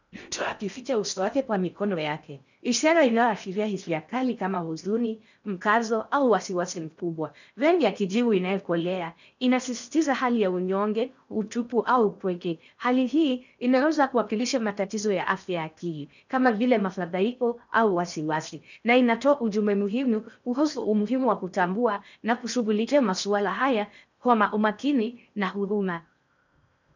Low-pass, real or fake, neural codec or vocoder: 7.2 kHz; fake; codec, 16 kHz in and 24 kHz out, 0.6 kbps, FocalCodec, streaming, 4096 codes